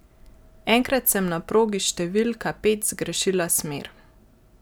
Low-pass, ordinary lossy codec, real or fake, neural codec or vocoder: none; none; real; none